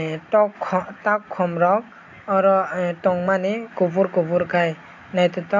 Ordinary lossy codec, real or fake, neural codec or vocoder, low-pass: AAC, 48 kbps; real; none; 7.2 kHz